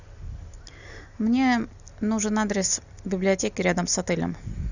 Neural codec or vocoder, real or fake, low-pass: none; real; 7.2 kHz